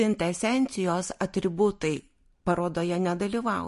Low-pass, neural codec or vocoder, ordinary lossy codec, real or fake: 14.4 kHz; none; MP3, 48 kbps; real